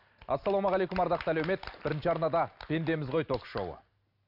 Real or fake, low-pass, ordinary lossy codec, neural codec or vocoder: real; 5.4 kHz; none; none